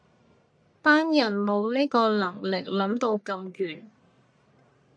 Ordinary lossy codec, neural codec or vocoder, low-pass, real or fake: MP3, 96 kbps; codec, 44.1 kHz, 1.7 kbps, Pupu-Codec; 9.9 kHz; fake